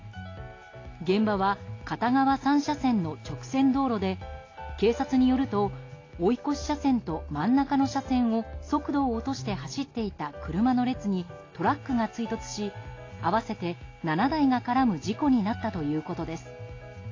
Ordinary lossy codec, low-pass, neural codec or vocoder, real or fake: AAC, 32 kbps; 7.2 kHz; none; real